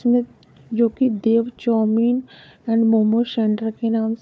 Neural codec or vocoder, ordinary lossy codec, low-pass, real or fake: codec, 16 kHz, 4 kbps, FunCodec, trained on Chinese and English, 50 frames a second; none; none; fake